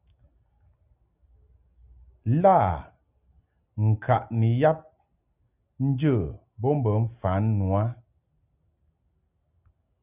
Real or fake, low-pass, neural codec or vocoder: real; 3.6 kHz; none